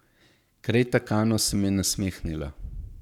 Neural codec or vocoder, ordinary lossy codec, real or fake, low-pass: codec, 44.1 kHz, 7.8 kbps, Pupu-Codec; none; fake; 19.8 kHz